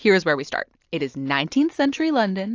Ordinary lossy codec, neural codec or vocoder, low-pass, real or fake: AAC, 48 kbps; none; 7.2 kHz; real